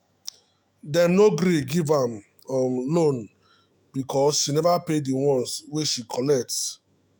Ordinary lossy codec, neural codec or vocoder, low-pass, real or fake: none; autoencoder, 48 kHz, 128 numbers a frame, DAC-VAE, trained on Japanese speech; none; fake